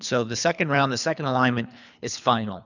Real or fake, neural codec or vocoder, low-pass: fake; codec, 24 kHz, 3 kbps, HILCodec; 7.2 kHz